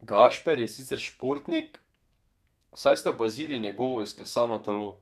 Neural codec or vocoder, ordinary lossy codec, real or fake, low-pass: codec, 32 kHz, 1.9 kbps, SNAC; MP3, 96 kbps; fake; 14.4 kHz